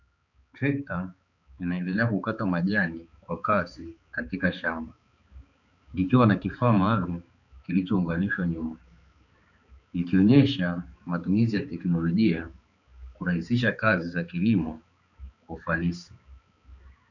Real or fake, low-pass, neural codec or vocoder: fake; 7.2 kHz; codec, 16 kHz, 4 kbps, X-Codec, HuBERT features, trained on balanced general audio